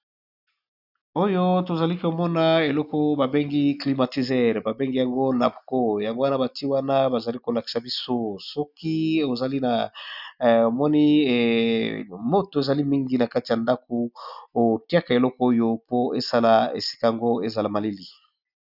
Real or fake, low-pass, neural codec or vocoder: real; 5.4 kHz; none